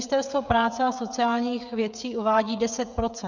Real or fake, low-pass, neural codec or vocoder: fake; 7.2 kHz; codec, 16 kHz, 16 kbps, FreqCodec, smaller model